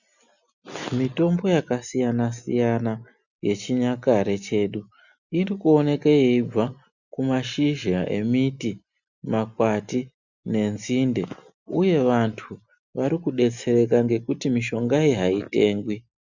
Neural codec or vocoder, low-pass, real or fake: none; 7.2 kHz; real